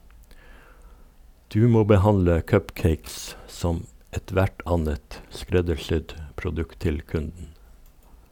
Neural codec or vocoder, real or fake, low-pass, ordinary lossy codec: none; real; 19.8 kHz; none